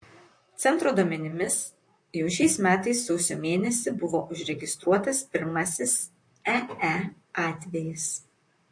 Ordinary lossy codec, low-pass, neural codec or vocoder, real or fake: MP3, 48 kbps; 9.9 kHz; vocoder, 44.1 kHz, 128 mel bands, Pupu-Vocoder; fake